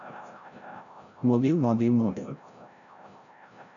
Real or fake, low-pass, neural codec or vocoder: fake; 7.2 kHz; codec, 16 kHz, 0.5 kbps, FreqCodec, larger model